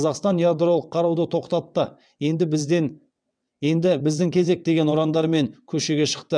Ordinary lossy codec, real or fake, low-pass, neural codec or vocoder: none; fake; 9.9 kHz; vocoder, 22.05 kHz, 80 mel bands, WaveNeXt